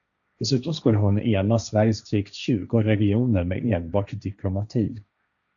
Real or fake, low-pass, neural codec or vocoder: fake; 7.2 kHz; codec, 16 kHz, 1.1 kbps, Voila-Tokenizer